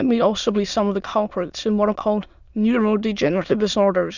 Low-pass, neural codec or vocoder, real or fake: 7.2 kHz; autoencoder, 22.05 kHz, a latent of 192 numbers a frame, VITS, trained on many speakers; fake